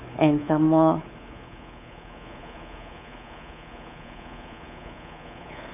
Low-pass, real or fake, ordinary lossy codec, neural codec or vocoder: 3.6 kHz; fake; none; codec, 24 kHz, 3.1 kbps, DualCodec